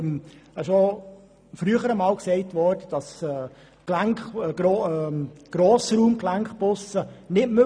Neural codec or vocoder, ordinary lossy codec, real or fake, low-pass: none; none; real; 9.9 kHz